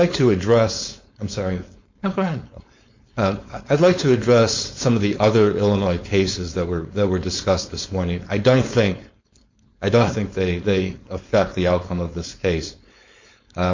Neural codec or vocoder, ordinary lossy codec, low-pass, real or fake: codec, 16 kHz, 4.8 kbps, FACodec; MP3, 48 kbps; 7.2 kHz; fake